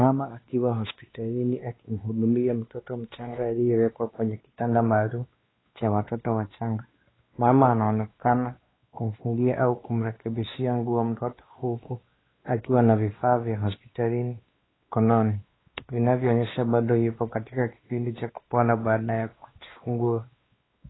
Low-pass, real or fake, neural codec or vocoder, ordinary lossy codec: 7.2 kHz; fake; codec, 16 kHz, 2 kbps, X-Codec, WavLM features, trained on Multilingual LibriSpeech; AAC, 16 kbps